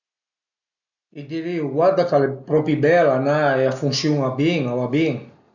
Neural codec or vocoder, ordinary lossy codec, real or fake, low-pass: none; none; real; 7.2 kHz